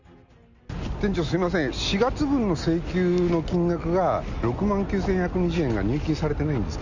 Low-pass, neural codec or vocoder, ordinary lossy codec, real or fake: 7.2 kHz; none; none; real